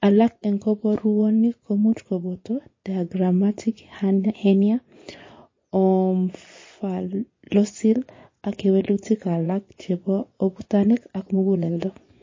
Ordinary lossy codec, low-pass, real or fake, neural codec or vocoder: MP3, 32 kbps; 7.2 kHz; fake; vocoder, 24 kHz, 100 mel bands, Vocos